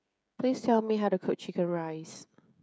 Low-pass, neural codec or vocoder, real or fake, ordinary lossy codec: none; codec, 16 kHz, 16 kbps, FreqCodec, smaller model; fake; none